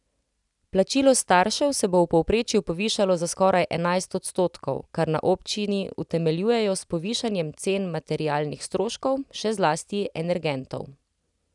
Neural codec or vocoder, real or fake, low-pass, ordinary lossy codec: none; real; 10.8 kHz; none